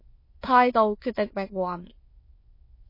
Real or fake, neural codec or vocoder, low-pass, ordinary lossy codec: fake; autoencoder, 22.05 kHz, a latent of 192 numbers a frame, VITS, trained on many speakers; 5.4 kHz; MP3, 24 kbps